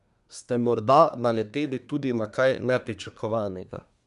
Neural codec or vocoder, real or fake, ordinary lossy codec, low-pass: codec, 24 kHz, 1 kbps, SNAC; fake; none; 10.8 kHz